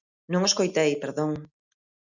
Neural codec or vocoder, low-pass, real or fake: none; 7.2 kHz; real